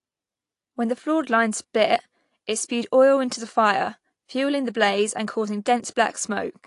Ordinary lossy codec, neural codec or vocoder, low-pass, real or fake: AAC, 48 kbps; none; 10.8 kHz; real